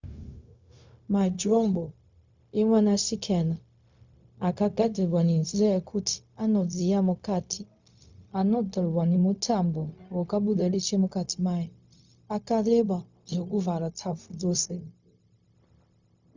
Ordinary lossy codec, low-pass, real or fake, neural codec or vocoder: Opus, 64 kbps; 7.2 kHz; fake; codec, 16 kHz, 0.4 kbps, LongCat-Audio-Codec